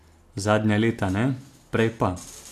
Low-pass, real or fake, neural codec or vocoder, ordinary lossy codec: 14.4 kHz; real; none; AAC, 64 kbps